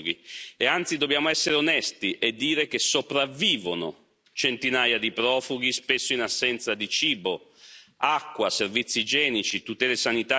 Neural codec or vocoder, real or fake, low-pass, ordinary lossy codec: none; real; none; none